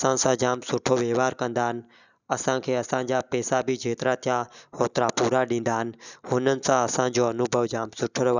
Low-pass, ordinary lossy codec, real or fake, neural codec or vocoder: 7.2 kHz; none; real; none